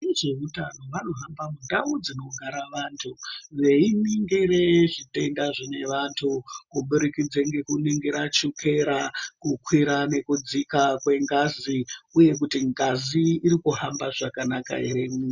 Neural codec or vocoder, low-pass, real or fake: none; 7.2 kHz; real